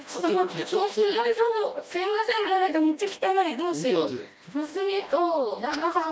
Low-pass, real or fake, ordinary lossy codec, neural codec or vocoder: none; fake; none; codec, 16 kHz, 1 kbps, FreqCodec, smaller model